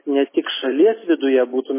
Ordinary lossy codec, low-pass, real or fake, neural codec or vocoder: MP3, 16 kbps; 3.6 kHz; real; none